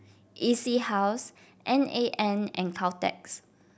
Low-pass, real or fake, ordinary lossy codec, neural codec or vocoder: none; real; none; none